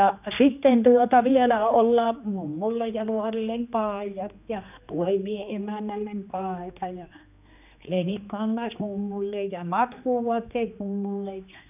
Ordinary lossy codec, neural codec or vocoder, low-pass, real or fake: none; codec, 16 kHz, 2 kbps, X-Codec, HuBERT features, trained on general audio; 3.6 kHz; fake